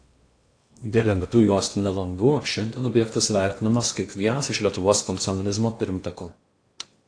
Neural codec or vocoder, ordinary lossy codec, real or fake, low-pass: codec, 16 kHz in and 24 kHz out, 0.8 kbps, FocalCodec, streaming, 65536 codes; AAC, 48 kbps; fake; 9.9 kHz